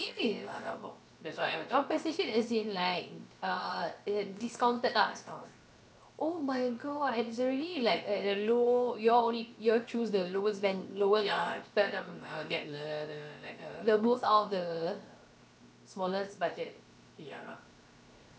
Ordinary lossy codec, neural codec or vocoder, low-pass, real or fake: none; codec, 16 kHz, 0.7 kbps, FocalCodec; none; fake